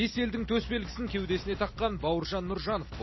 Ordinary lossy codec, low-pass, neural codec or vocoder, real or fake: MP3, 24 kbps; 7.2 kHz; none; real